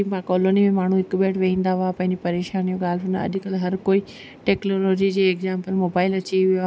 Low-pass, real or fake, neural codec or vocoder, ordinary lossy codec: none; real; none; none